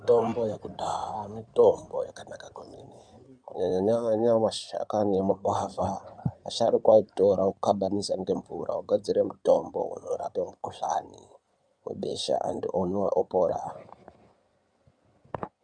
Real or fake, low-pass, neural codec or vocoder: fake; 9.9 kHz; codec, 16 kHz in and 24 kHz out, 2.2 kbps, FireRedTTS-2 codec